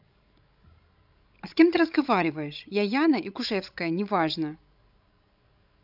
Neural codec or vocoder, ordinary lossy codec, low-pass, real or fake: codec, 16 kHz, 16 kbps, FreqCodec, larger model; none; 5.4 kHz; fake